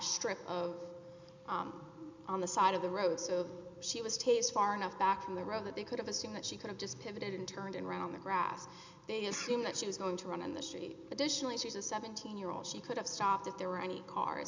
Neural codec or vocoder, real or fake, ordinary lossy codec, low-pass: none; real; MP3, 64 kbps; 7.2 kHz